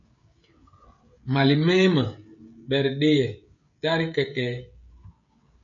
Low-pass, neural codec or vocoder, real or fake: 7.2 kHz; codec, 16 kHz, 16 kbps, FreqCodec, smaller model; fake